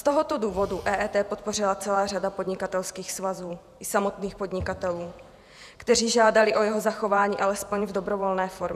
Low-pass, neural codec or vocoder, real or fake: 14.4 kHz; vocoder, 48 kHz, 128 mel bands, Vocos; fake